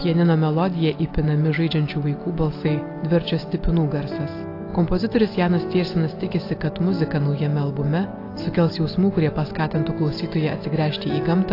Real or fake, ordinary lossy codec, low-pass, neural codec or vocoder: real; AAC, 32 kbps; 5.4 kHz; none